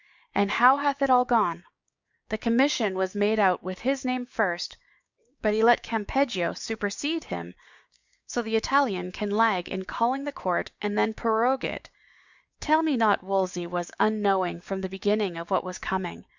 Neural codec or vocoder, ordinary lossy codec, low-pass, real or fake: codec, 16 kHz, 6 kbps, DAC; Opus, 64 kbps; 7.2 kHz; fake